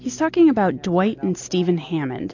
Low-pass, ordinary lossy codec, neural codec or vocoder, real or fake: 7.2 kHz; AAC, 48 kbps; none; real